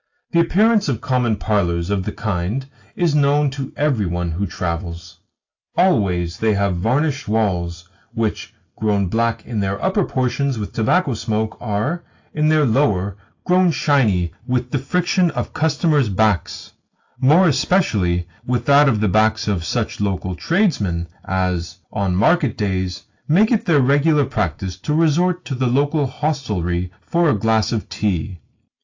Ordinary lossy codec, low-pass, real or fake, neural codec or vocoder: AAC, 48 kbps; 7.2 kHz; real; none